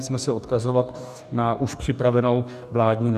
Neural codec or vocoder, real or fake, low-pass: codec, 44.1 kHz, 2.6 kbps, DAC; fake; 14.4 kHz